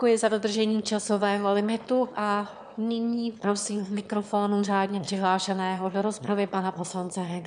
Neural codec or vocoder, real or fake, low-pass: autoencoder, 22.05 kHz, a latent of 192 numbers a frame, VITS, trained on one speaker; fake; 9.9 kHz